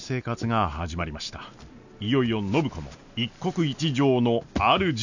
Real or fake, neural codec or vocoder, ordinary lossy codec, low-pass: real; none; none; 7.2 kHz